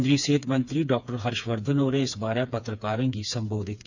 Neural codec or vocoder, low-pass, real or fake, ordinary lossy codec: codec, 16 kHz, 4 kbps, FreqCodec, smaller model; 7.2 kHz; fake; none